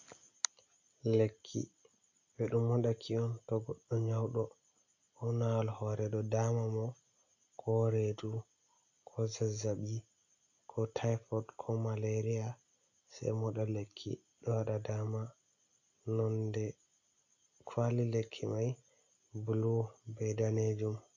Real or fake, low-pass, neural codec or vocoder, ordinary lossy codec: real; 7.2 kHz; none; AAC, 48 kbps